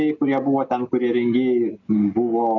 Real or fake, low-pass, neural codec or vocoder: real; 7.2 kHz; none